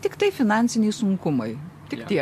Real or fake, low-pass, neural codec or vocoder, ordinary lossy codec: real; 14.4 kHz; none; MP3, 64 kbps